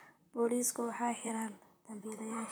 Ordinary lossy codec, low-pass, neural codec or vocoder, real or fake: none; none; vocoder, 44.1 kHz, 128 mel bands, Pupu-Vocoder; fake